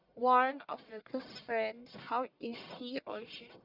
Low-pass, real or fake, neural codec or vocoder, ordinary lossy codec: 5.4 kHz; fake; codec, 44.1 kHz, 1.7 kbps, Pupu-Codec; Opus, 64 kbps